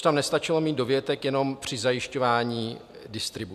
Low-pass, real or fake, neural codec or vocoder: 14.4 kHz; real; none